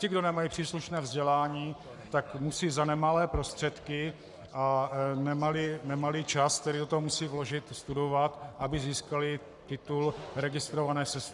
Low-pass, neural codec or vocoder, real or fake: 10.8 kHz; codec, 44.1 kHz, 7.8 kbps, Pupu-Codec; fake